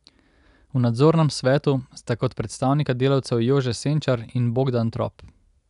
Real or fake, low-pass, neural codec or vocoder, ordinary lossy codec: real; 10.8 kHz; none; none